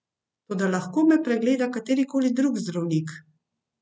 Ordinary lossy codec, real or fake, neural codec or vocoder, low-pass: none; real; none; none